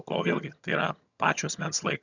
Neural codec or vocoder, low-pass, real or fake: vocoder, 22.05 kHz, 80 mel bands, HiFi-GAN; 7.2 kHz; fake